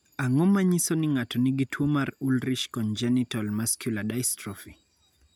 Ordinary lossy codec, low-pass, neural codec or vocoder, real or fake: none; none; none; real